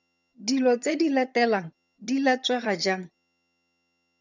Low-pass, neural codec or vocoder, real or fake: 7.2 kHz; vocoder, 22.05 kHz, 80 mel bands, HiFi-GAN; fake